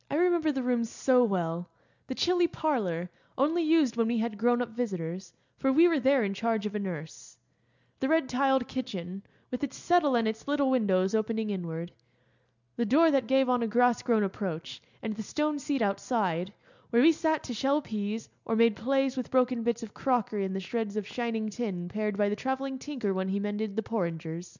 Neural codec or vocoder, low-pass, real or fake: none; 7.2 kHz; real